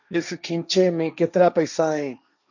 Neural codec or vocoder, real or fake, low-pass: codec, 16 kHz, 1.1 kbps, Voila-Tokenizer; fake; 7.2 kHz